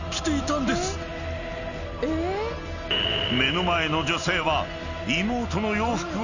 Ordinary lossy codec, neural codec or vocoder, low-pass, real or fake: none; none; 7.2 kHz; real